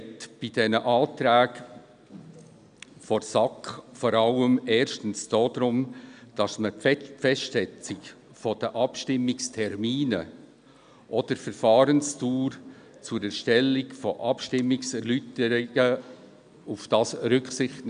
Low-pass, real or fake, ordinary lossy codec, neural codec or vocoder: 9.9 kHz; real; none; none